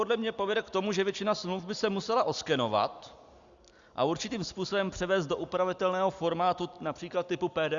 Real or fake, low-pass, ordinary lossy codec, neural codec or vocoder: real; 7.2 kHz; Opus, 64 kbps; none